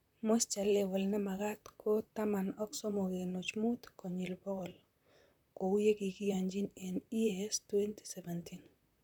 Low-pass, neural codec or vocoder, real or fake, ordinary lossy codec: 19.8 kHz; vocoder, 44.1 kHz, 128 mel bands, Pupu-Vocoder; fake; none